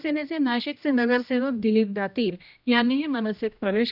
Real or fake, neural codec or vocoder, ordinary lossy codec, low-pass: fake; codec, 16 kHz, 1 kbps, X-Codec, HuBERT features, trained on general audio; none; 5.4 kHz